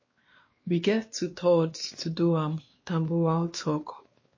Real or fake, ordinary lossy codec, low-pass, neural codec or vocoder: fake; MP3, 32 kbps; 7.2 kHz; codec, 16 kHz, 2 kbps, X-Codec, WavLM features, trained on Multilingual LibriSpeech